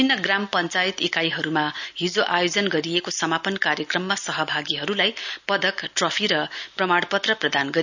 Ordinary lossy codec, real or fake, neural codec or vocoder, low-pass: none; real; none; 7.2 kHz